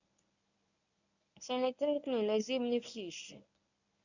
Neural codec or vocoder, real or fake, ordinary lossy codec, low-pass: codec, 24 kHz, 0.9 kbps, WavTokenizer, medium speech release version 1; fake; none; 7.2 kHz